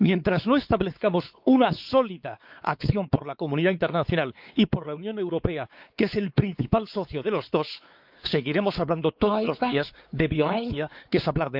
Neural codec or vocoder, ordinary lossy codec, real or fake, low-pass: codec, 16 kHz, 4 kbps, X-Codec, WavLM features, trained on Multilingual LibriSpeech; Opus, 24 kbps; fake; 5.4 kHz